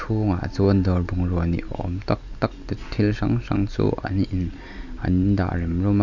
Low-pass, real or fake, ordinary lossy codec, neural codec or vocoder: 7.2 kHz; real; none; none